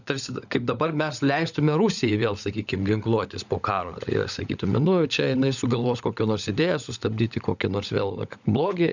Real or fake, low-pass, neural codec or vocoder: fake; 7.2 kHz; codec, 16 kHz, 16 kbps, FunCodec, trained on LibriTTS, 50 frames a second